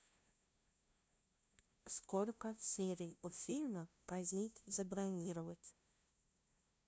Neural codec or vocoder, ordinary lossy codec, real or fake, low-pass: codec, 16 kHz, 0.5 kbps, FunCodec, trained on LibriTTS, 25 frames a second; none; fake; none